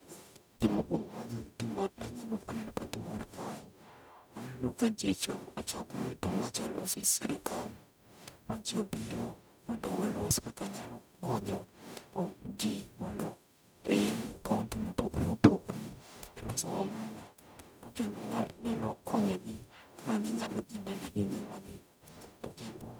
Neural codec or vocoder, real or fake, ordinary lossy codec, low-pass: codec, 44.1 kHz, 0.9 kbps, DAC; fake; none; none